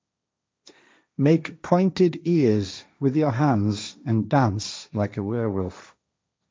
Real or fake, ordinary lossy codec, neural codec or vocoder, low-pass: fake; none; codec, 16 kHz, 1.1 kbps, Voila-Tokenizer; none